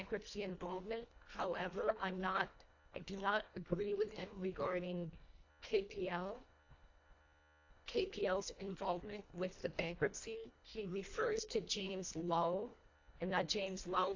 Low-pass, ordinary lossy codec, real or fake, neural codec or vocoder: 7.2 kHz; AAC, 48 kbps; fake; codec, 24 kHz, 1.5 kbps, HILCodec